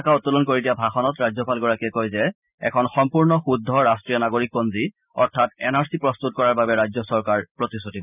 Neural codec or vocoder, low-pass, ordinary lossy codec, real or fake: none; 3.6 kHz; none; real